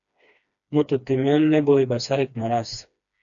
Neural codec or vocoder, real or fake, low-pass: codec, 16 kHz, 2 kbps, FreqCodec, smaller model; fake; 7.2 kHz